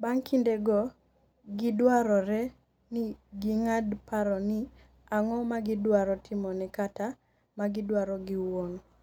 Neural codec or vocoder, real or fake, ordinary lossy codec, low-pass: none; real; none; 19.8 kHz